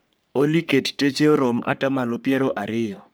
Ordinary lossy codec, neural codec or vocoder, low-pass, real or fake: none; codec, 44.1 kHz, 3.4 kbps, Pupu-Codec; none; fake